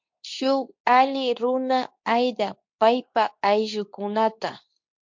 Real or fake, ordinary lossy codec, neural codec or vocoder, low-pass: fake; MP3, 48 kbps; codec, 24 kHz, 0.9 kbps, WavTokenizer, medium speech release version 2; 7.2 kHz